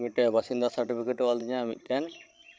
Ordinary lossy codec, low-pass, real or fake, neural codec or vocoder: none; none; fake; codec, 16 kHz, 16 kbps, FreqCodec, larger model